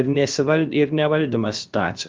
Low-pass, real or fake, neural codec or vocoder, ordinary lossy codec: 7.2 kHz; fake; codec, 16 kHz, about 1 kbps, DyCAST, with the encoder's durations; Opus, 32 kbps